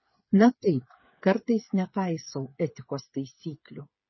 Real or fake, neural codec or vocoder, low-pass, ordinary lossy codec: fake; codec, 16 kHz, 8 kbps, FreqCodec, smaller model; 7.2 kHz; MP3, 24 kbps